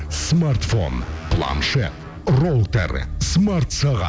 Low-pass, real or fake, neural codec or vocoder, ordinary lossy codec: none; real; none; none